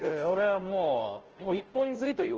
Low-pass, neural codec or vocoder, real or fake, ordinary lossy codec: 7.2 kHz; codec, 16 kHz, 0.5 kbps, FunCodec, trained on Chinese and English, 25 frames a second; fake; Opus, 16 kbps